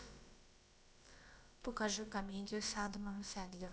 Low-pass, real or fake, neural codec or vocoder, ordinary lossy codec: none; fake; codec, 16 kHz, about 1 kbps, DyCAST, with the encoder's durations; none